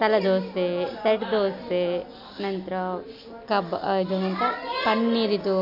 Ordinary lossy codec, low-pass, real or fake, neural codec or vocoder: none; 5.4 kHz; real; none